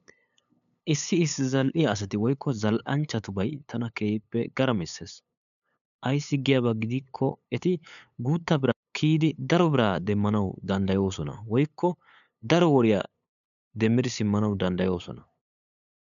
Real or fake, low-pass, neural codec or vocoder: fake; 7.2 kHz; codec, 16 kHz, 8 kbps, FunCodec, trained on LibriTTS, 25 frames a second